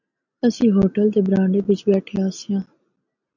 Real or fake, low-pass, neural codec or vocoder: real; 7.2 kHz; none